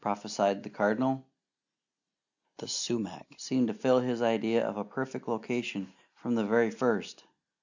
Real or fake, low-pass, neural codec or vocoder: real; 7.2 kHz; none